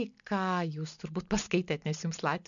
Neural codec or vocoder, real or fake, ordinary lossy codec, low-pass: none; real; MP3, 96 kbps; 7.2 kHz